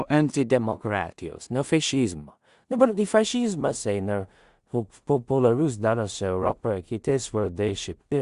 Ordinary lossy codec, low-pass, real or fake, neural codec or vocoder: Opus, 64 kbps; 10.8 kHz; fake; codec, 16 kHz in and 24 kHz out, 0.4 kbps, LongCat-Audio-Codec, two codebook decoder